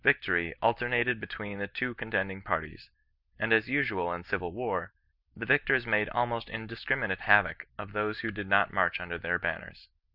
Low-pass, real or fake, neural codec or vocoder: 5.4 kHz; real; none